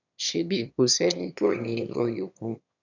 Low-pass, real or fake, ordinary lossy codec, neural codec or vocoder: 7.2 kHz; fake; none; autoencoder, 22.05 kHz, a latent of 192 numbers a frame, VITS, trained on one speaker